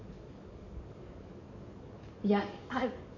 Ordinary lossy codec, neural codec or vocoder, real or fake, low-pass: none; codec, 44.1 kHz, 7.8 kbps, DAC; fake; 7.2 kHz